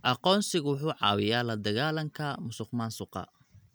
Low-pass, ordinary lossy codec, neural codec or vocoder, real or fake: none; none; none; real